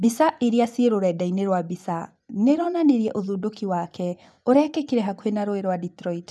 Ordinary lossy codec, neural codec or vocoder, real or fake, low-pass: none; vocoder, 24 kHz, 100 mel bands, Vocos; fake; none